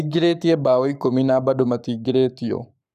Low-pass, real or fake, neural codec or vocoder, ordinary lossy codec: 14.4 kHz; fake; codec, 44.1 kHz, 7.8 kbps, Pupu-Codec; none